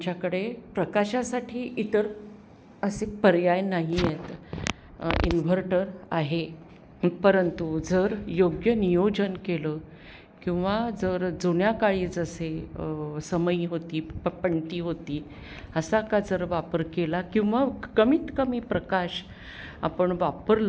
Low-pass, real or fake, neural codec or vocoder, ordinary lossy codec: none; real; none; none